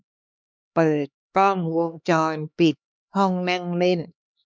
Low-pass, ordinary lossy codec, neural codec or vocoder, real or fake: none; none; codec, 16 kHz, 2 kbps, X-Codec, HuBERT features, trained on LibriSpeech; fake